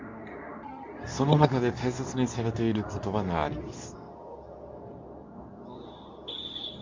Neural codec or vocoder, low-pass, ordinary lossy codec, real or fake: codec, 24 kHz, 0.9 kbps, WavTokenizer, medium speech release version 2; 7.2 kHz; MP3, 64 kbps; fake